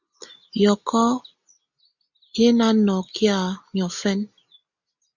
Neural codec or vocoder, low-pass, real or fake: none; 7.2 kHz; real